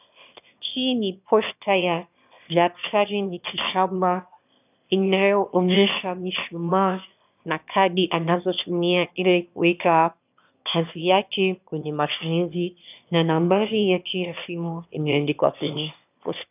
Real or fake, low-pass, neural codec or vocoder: fake; 3.6 kHz; autoencoder, 22.05 kHz, a latent of 192 numbers a frame, VITS, trained on one speaker